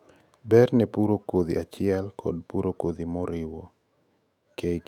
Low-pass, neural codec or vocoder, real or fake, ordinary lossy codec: 19.8 kHz; autoencoder, 48 kHz, 128 numbers a frame, DAC-VAE, trained on Japanese speech; fake; none